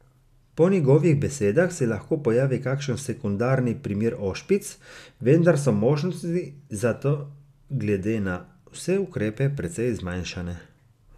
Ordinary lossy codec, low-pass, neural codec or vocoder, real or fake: none; 14.4 kHz; none; real